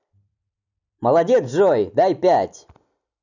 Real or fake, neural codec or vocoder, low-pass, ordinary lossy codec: real; none; 7.2 kHz; none